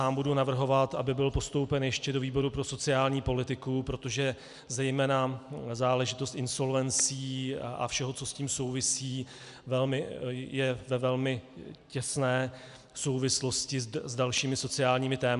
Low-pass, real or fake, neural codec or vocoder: 10.8 kHz; real; none